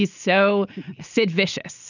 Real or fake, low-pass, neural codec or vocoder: real; 7.2 kHz; none